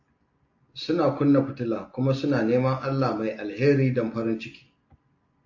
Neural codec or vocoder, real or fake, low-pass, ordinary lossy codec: none; real; 7.2 kHz; AAC, 48 kbps